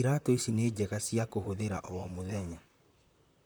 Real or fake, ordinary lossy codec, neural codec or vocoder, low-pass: fake; none; vocoder, 44.1 kHz, 128 mel bands, Pupu-Vocoder; none